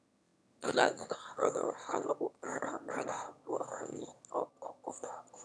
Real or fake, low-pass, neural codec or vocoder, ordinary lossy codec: fake; none; autoencoder, 22.05 kHz, a latent of 192 numbers a frame, VITS, trained on one speaker; none